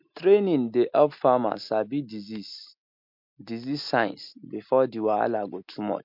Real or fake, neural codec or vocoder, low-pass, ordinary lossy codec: real; none; 5.4 kHz; MP3, 48 kbps